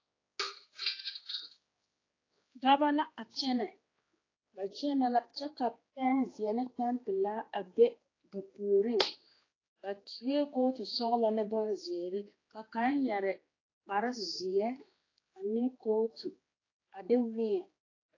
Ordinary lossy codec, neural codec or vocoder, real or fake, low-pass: AAC, 32 kbps; codec, 16 kHz, 2 kbps, X-Codec, HuBERT features, trained on general audio; fake; 7.2 kHz